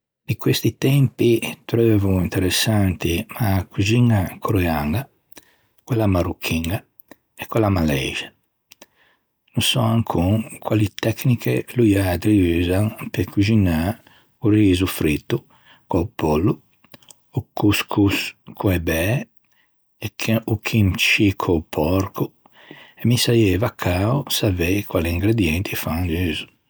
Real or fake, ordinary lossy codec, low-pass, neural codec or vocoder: real; none; none; none